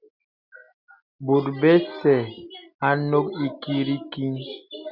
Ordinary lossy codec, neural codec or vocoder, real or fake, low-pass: Opus, 64 kbps; none; real; 5.4 kHz